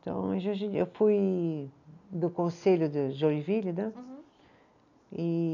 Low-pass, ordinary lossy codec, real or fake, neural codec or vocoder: 7.2 kHz; none; real; none